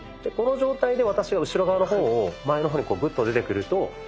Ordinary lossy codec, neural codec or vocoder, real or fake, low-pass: none; none; real; none